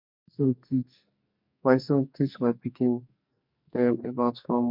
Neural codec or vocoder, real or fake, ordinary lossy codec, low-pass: codec, 44.1 kHz, 2.6 kbps, SNAC; fake; MP3, 48 kbps; 5.4 kHz